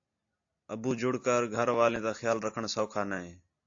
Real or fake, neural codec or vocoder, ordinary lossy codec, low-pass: real; none; AAC, 48 kbps; 7.2 kHz